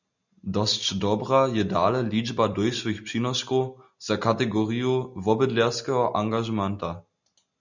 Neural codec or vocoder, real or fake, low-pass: none; real; 7.2 kHz